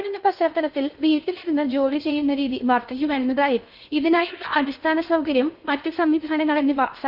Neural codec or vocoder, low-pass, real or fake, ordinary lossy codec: codec, 16 kHz in and 24 kHz out, 0.6 kbps, FocalCodec, streaming, 2048 codes; 5.4 kHz; fake; Opus, 64 kbps